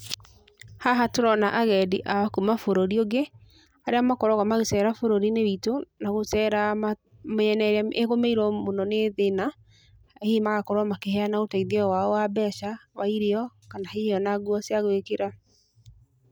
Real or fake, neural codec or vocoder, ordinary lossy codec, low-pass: real; none; none; none